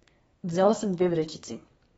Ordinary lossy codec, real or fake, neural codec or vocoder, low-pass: AAC, 24 kbps; fake; codec, 32 kHz, 1.9 kbps, SNAC; 14.4 kHz